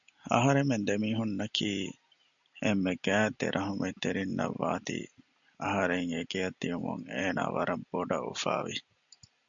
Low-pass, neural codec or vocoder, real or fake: 7.2 kHz; none; real